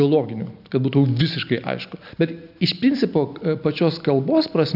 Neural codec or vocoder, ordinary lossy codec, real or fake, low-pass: none; AAC, 48 kbps; real; 5.4 kHz